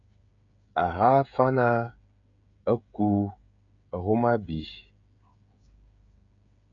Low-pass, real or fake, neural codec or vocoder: 7.2 kHz; fake; codec, 16 kHz, 16 kbps, FreqCodec, smaller model